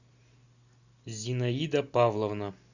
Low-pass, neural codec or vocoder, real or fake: 7.2 kHz; none; real